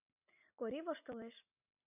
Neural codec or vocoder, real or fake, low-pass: none; real; 3.6 kHz